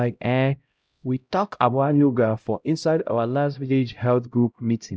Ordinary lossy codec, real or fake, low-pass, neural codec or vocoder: none; fake; none; codec, 16 kHz, 0.5 kbps, X-Codec, HuBERT features, trained on LibriSpeech